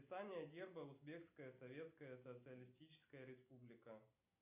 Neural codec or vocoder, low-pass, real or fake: none; 3.6 kHz; real